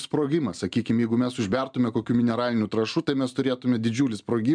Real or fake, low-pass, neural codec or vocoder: real; 9.9 kHz; none